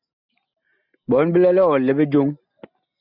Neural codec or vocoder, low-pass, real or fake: none; 5.4 kHz; real